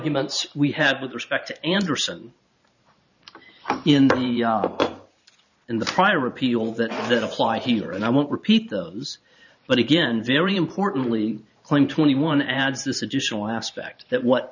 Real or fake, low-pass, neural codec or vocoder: fake; 7.2 kHz; vocoder, 44.1 kHz, 128 mel bands every 512 samples, BigVGAN v2